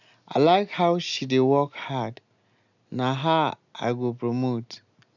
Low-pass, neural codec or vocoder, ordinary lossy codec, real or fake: 7.2 kHz; none; none; real